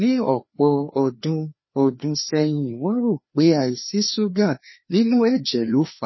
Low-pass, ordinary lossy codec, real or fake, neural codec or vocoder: 7.2 kHz; MP3, 24 kbps; fake; codec, 16 kHz, 2 kbps, FreqCodec, larger model